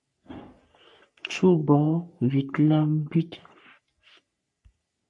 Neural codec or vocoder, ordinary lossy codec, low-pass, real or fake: codec, 44.1 kHz, 3.4 kbps, Pupu-Codec; MP3, 64 kbps; 10.8 kHz; fake